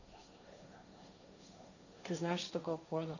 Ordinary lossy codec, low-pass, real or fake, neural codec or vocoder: none; 7.2 kHz; fake; codec, 16 kHz, 1.1 kbps, Voila-Tokenizer